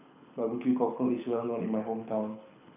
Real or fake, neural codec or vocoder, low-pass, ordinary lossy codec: fake; codec, 24 kHz, 6 kbps, HILCodec; 3.6 kHz; none